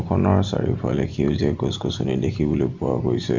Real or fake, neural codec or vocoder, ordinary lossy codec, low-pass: real; none; none; 7.2 kHz